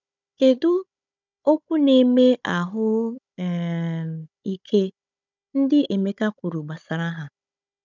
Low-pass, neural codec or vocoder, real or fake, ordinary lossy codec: 7.2 kHz; codec, 16 kHz, 16 kbps, FunCodec, trained on Chinese and English, 50 frames a second; fake; none